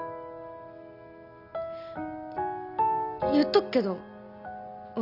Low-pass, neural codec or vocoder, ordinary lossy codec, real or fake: 5.4 kHz; none; none; real